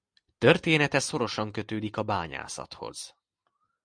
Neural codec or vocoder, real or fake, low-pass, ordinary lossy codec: none; real; 9.9 kHz; Opus, 64 kbps